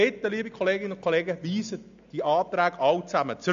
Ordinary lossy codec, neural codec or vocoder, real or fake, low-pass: AAC, 96 kbps; none; real; 7.2 kHz